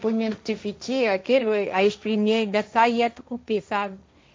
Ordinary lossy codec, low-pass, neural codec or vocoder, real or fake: none; none; codec, 16 kHz, 1.1 kbps, Voila-Tokenizer; fake